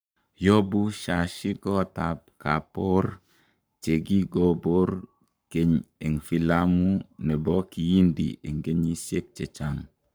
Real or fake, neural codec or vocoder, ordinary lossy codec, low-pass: fake; codec, 44.1 kHz, 7.8 kbps, Pupu-Codec; none; none